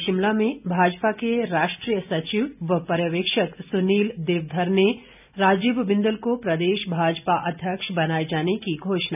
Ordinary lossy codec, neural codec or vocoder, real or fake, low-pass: none; none; real; 3.6 kHz